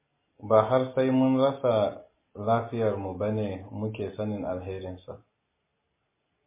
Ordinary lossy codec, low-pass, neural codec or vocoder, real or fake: MP3, 16 kbps; 3.6 kHz; none; real